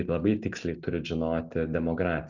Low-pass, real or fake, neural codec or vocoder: 7.2 kHz; real; none